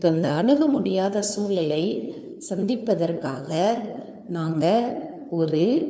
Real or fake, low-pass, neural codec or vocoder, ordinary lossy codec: fake; none; codec, 16 kHz, 2 kbps, FunCodec, trained on LibriTTS, 25 frames a second; none